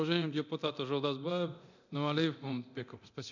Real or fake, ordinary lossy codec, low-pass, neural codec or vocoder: fake; none; 7.2 kHz; codec, 24 kHz, 0.9 kbps, DualCodec